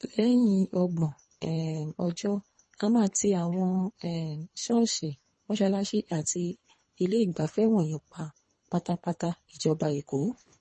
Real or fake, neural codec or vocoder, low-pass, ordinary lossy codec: fake; codec, 24 kHz, 3 kbps, HILCodec; 10.8 kHz; MP3, 32 kbps